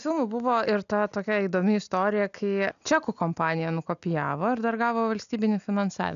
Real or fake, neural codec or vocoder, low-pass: real; none; 7.2 kHz